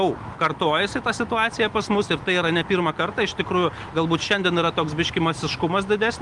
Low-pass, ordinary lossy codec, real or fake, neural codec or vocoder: 10.8 kHz; Opus, 32 kbps; real; none